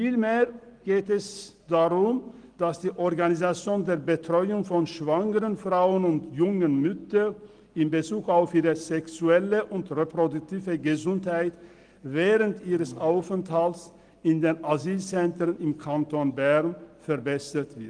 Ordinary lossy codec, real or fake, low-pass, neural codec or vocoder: Opus, 24 kbps; real; 9.9 kHz; none